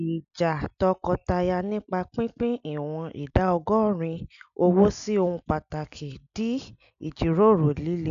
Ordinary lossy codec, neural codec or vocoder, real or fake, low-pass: MP3, 96 kbps; none; real; 7.2 kHz